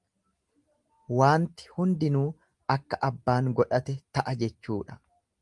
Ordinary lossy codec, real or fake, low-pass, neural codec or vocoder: Opus, 24 kbps; real; 10.8 kHz; none